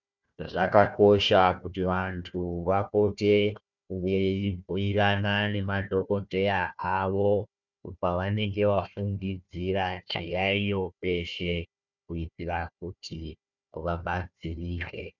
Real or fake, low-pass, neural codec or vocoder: fake; 7.2 kHz; codec, 16 kHz, 1 kbps, FunCodec, trained on Chinese and English, 50 frames a second